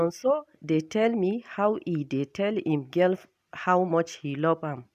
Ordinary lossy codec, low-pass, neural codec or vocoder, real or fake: none; 14.4 kHz; none; real